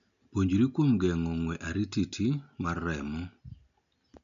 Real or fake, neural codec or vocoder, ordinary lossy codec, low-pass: real; none; none; 7.2 kHz